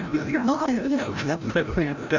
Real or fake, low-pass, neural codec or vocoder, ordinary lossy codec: fake; 7.2 kHz; codec, 16 kHz, 0.5 kbps, FreqCodec, larger model; none